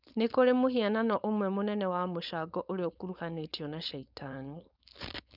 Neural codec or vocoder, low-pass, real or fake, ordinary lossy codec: codec, 16 kHz, 4.8 kbps, FACodec; 5.4 kHz; fake; none